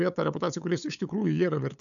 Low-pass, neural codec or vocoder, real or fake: 7.2 kHz; codec, 16 kHz, 8 kbps, FunCodec, trained on LibriTTS, 25 frames a second; fake